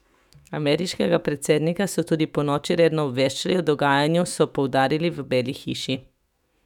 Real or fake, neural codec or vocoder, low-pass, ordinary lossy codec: fake; autoencoder, 48 kHz, 128 numbers a frame, DAC-VAE, trained on Japanese speech; 19.8 kHz; none